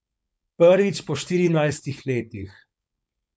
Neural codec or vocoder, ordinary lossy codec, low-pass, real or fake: codec, 16 kHz, 4.8 kbps, FACodec; none; none; fake